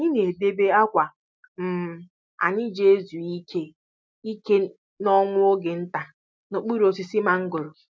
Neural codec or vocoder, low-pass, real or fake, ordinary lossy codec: none; none; real; none